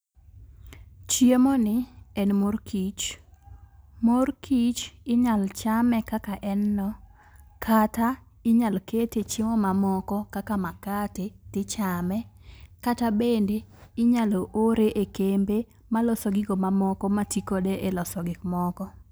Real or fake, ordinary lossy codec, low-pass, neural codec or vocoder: real; none; none; none